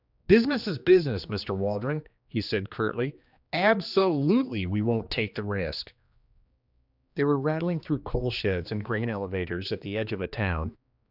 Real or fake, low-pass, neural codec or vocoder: fake; 5.4 kHz; codec, 16 kHz, 2 kbps, X-Codec, HuBERT features, trained on general audio